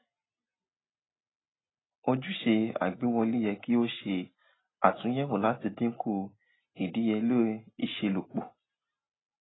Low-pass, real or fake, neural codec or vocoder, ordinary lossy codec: 7.2 kHz; real; none; AAC, 16 kbps